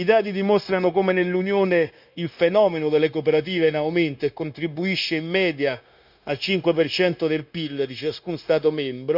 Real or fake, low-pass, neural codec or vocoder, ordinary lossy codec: fake; 5.4 kHz; codec, 16 kHz, 0.9 kbps, LongCat-Audio-Codec; none